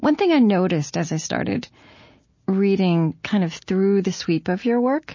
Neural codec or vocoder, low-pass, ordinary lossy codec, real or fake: none; 7.2 kHz; MP3, 32 kbps; real